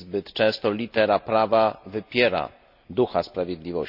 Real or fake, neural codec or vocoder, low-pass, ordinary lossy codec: real; none; 5.4 kHz; none